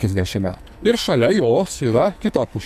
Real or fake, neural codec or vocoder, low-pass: fake; codec, 44.1 kHz, 2.6 kbps, SNAC; 14.4 kHz